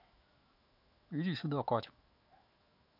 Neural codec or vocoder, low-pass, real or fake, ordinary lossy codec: autoencoder, 48 kHz, 128 numbers a frame, DAC-VAE, trained on Japanese speech; 5.4 kHz; fake; none